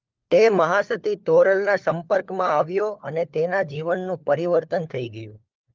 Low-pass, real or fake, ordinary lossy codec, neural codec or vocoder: 7.2 kHz; fake; Opus, 32 kbps; codec, 16 kHz, 4 kbps, FunCodec, trained on LibriTTS, 50 frames a second